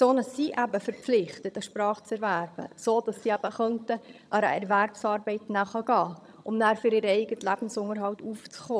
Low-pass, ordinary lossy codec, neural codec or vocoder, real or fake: none; none; vocoder, 22.05 kHz, 80 mel bands, HiFi-GAN; fake